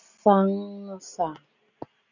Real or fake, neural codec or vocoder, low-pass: real; none; 7.2 kHz